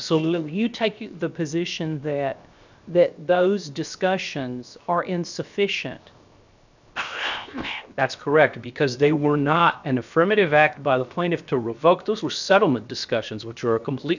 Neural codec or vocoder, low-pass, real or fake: codec, 16 kHz, 0.7 kbps, FocalCodec; 7.2 kHz; fake